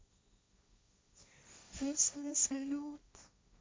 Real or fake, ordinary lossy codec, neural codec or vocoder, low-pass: fake; none; codec, 16 kHz, 1.1 kbps, Voila-Tokenizer; none